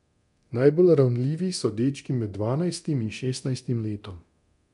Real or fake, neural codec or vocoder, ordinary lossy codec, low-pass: fake; codec, 24 kHz, 0.9 kbps, DualCodec; none; 10.8 kHz